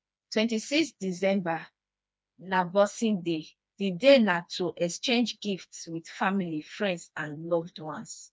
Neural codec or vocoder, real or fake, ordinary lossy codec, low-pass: codec, 16 kHz, 2 kbps, FreqCodec, smaller model; fake; none; none